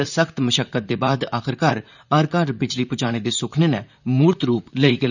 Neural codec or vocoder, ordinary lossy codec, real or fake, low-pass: vocoder, 44.1 kHz, 128 mel bands, Pupu-Vocoder; none; fake; 7.2 kHz